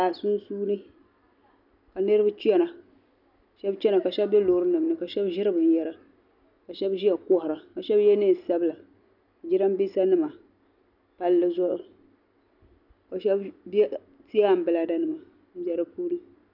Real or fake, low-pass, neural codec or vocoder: real; 5.4 kHz; none